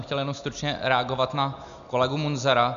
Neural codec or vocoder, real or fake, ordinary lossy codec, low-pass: none; real; MP3, 96 kbps; 7.2 kHz